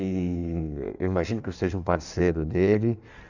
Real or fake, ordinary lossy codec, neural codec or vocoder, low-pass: fake; none; codec, 16 kHz in and 24 kHz out, 1.1 kbps, FireRedTTS-2 codec; 7.2 kHz